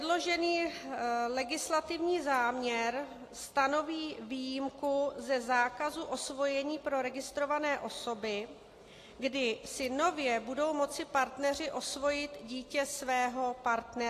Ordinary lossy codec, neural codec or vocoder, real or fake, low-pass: AAC, 48 kbps; none; real; 14.4 kHz